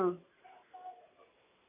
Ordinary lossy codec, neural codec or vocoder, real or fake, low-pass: none; none; real; 3.6 kHz